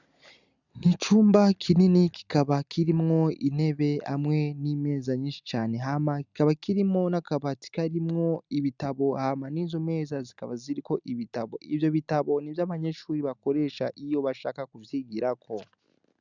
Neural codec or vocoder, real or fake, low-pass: none; real; 7.2 kHz